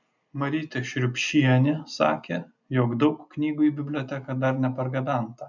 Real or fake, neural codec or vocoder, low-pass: real; none; 7.2 kHz